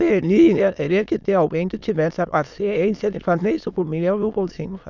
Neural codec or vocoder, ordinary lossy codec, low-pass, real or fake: autoencoder, 22.05 kHz, a latent of 192 numbers a frame, VITS, trained on many speakers; none; 7.2 kHz; fake